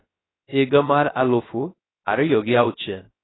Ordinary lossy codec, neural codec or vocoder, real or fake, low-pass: AAC, 16 kbps; codec, 16 kHz, 0.3 kbps, FocalCodec; fake; 7.2 kHz